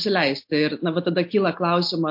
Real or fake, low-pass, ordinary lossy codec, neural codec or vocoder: real; 5.4 kHz; MP3, 32 kbps; none